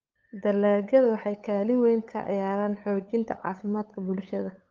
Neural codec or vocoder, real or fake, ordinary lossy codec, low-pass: codec, 16 kHz, 8 kbps, FunCodec, trained on LibriTTS, 25 frames a second; fake; Opus, 32 kbps; 7.2 kHz